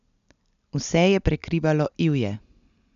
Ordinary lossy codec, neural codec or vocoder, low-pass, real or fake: none; none; 7.2 kHz; real